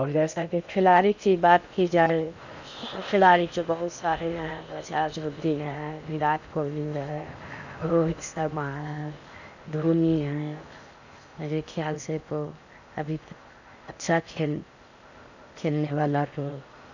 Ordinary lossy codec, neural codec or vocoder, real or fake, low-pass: none; codec, 16 kHz in and 24 kHz out, 0.6 kbps, FocalCodec, streaming, 2048 codes; fake; 7.2 kHz